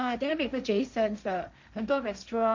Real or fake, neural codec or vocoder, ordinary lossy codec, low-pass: fake; codec, 16 kHz, 1.1 kbps, Voila-Tokenizer; MP3, 64 kbps; 7.2 kHz